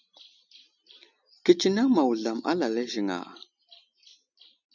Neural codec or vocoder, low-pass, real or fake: none; 7.2 kHz; real